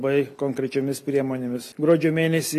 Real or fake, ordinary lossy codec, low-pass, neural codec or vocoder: real; MP3, 64 kbps; 14.4 kHz; none